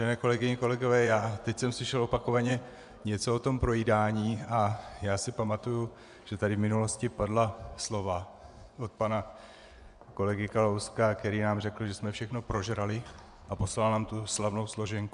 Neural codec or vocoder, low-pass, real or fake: vocoder, 24 kHz, 100 mel bands, Vocos; 10.8 kHz; fake